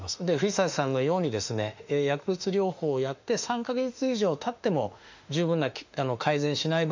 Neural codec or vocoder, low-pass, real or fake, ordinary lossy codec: autoencoder, 48 kHz, 32 numbers a frame, DAC-VAE, trained on Japanese speech; 7.2 kHz; fake; MP3, 64 kbps